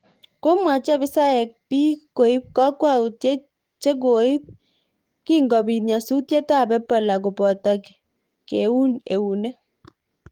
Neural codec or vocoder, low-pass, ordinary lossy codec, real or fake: codec, 44.1 kHz, 7.8 kbps, DAC; 19.8 kHz; Opus, 24 kbps; fake